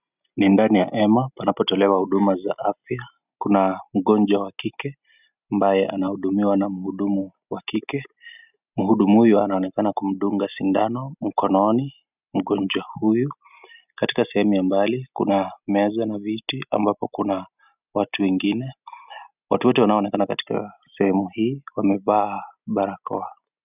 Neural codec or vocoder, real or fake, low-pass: none; real; 3.6 kHz